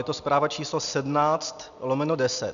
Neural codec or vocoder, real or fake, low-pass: none; real; 7.2 kHz